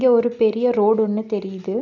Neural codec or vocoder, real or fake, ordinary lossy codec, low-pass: none; real; none; 7.2 kHz